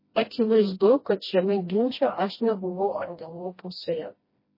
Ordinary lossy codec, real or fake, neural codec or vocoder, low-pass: MP3, 24 kbps; fake; codec, 16 kHz, 1 kbps, FreqCodec, smaller model; 5.4 kHz